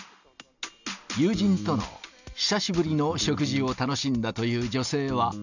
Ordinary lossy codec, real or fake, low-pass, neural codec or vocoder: none; real; 7.2 kHz; none